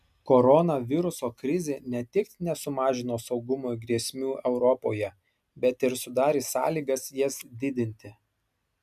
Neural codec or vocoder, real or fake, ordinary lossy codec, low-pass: none; real; MP3, 96 kbps; 14.4 kHz